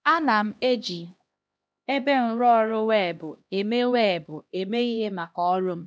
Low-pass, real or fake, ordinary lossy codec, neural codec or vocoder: none; fake; none; codec, 16 kHz, 1 kbps, X-Codec, HuBERT features, trained on LibriSpeech